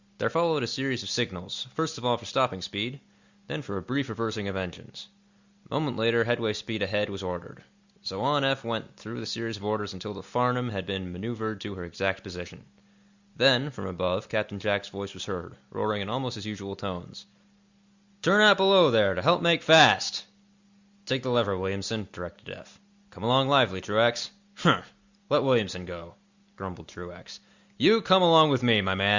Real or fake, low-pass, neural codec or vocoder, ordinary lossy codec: real; 7.2 kHz; none; Opus, 64 kbps